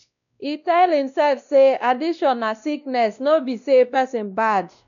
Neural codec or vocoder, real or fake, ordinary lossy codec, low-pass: codec, 16 kHz, 1 kbps, X-Codec, WavLM features, trained on Multilingual LibriSpeech; fake; none; 7.2 kHz